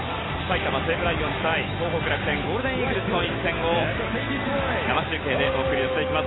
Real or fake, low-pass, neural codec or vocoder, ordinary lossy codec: real; 7.2 kHz; none; AAC, 16 kbps